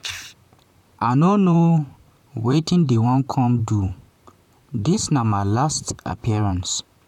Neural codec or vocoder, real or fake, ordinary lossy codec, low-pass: vocoder, 44.1 kHz, 128 mel bands, Pupu-Vocoder; fake; none; 19.8 kHz